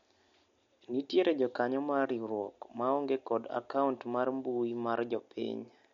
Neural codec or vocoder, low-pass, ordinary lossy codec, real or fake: none; 7.2 kHz; MP3, 48 kbps; real